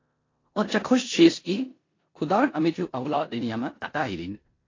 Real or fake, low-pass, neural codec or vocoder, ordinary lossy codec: fake; 7.2 kHz; codec, 16 kHz in and 24 kHz out, 0.9 kbps, LongCat-Audio-Codec, four codebook decoder; AAC, 32 kbps